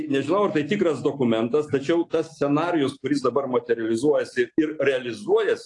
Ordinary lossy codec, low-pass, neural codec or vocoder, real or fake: AAC, 48 kbps; 10.8 kHz; none; real